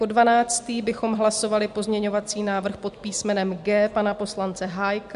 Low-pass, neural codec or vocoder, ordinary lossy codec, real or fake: 10.8 kHz; none; MP3, 64 kbps; real